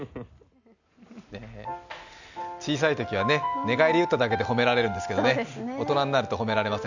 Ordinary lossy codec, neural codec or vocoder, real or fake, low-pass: none; none; real; 7.2 kHz